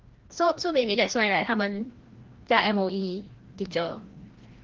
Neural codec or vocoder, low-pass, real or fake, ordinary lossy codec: codec, 16 kHz, 1 kbps, FreqCodec, larger model; 7.2 kHz; fake; Opus, 16 kbps